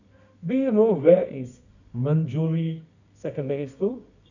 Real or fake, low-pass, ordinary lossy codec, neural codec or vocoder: fake; 7.2 kHz; none; codec, 24 kHz, 0.9 kbps, WavTokenizer, medium music audio release